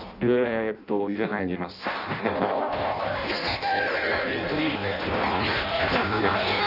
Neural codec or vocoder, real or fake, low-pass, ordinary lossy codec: codec, 16 kHz in and 24 kHz out, 0.6 kbps, FireRedTTS-2 codec; fake; 5.4 kHz; none